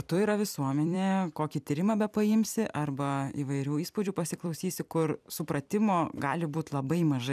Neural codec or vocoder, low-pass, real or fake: vocoder, 44.1 kHz, 128 mel bands every 256 samples, BigVGAN v2; 14.4 kHz; fake